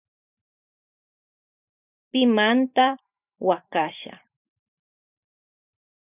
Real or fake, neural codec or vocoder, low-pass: fake; vocoder, 44.1 kHz, 128 mel bands every 512 samples, BigVGAN v2; 3.6 kHz